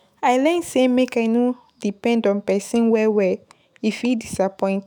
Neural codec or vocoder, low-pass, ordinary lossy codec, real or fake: autoencoder, 48 kHz, 128 numbers a frame, DAC-VAE, trained on Japanese speech; none; none; fake